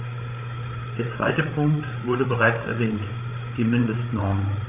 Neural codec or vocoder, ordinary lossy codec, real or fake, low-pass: codec, 16 kHz, 8 kbps, FreqCodec, larger model; none; fake; 3.6 kHz